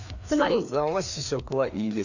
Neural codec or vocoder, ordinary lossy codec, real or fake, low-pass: codec, 16 kHz, 2 kbps, FreqCodec, larger model; none; fake; 7.2 kHz